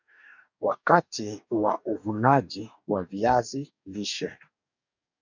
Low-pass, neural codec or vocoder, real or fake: 7.2 kHz; codec, 44.1 kHz, 2.6 kbps, DAC; fake